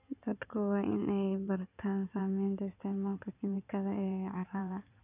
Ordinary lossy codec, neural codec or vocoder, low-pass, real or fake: none; codec, 16 kHz in and 24 kHz out, 2.2 kbps, FireRedTTS-2 codec; 3.6 kHz; fake